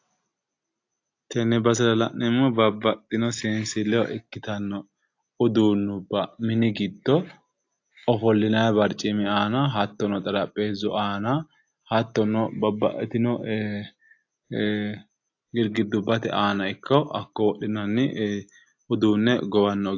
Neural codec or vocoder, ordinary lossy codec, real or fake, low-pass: none; AAC, 48 kbps; real; 7.2 kHz